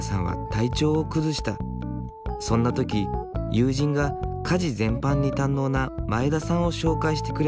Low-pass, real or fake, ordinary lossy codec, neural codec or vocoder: none; real; none; none